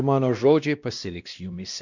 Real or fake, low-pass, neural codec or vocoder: fake; 7.2 kHz; codec, 16 kHz, 1 kbps, X-Codec, WavLM features, trained on Multilingual LibriSpeech